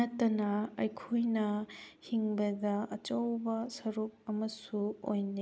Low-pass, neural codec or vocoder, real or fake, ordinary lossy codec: none; none; real; none